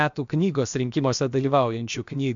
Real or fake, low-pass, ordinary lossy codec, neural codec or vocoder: fake; 7.2 kHz; MP3, 64 kbps; codec, 16 kHz, about 1 kbps, DyCAST, with the encoder's durations